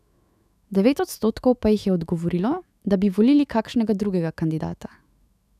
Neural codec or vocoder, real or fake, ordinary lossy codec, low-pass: autoencoder, 48 kHz, 128 numbers a frame, DAC-VAE, trained on Japanese speech; fake; none; 14.4 kHz